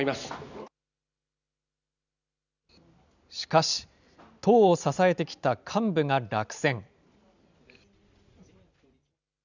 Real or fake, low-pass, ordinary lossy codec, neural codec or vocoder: real; 7.2 kHz; none; none